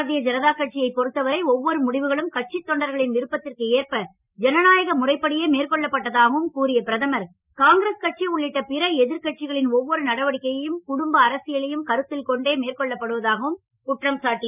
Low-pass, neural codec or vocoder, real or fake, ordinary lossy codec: 3.6 kHz; none; real; none